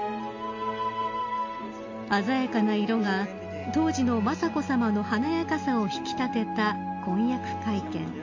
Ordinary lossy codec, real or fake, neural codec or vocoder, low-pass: AAC, 48 kbps; real; none; 7.2 kHz